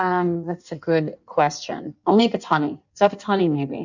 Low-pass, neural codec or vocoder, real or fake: 7.2 kHz; codec, 16 kHz in and 24 kHz out, 1.1 kbps, FireRedTTS-2 codec; fake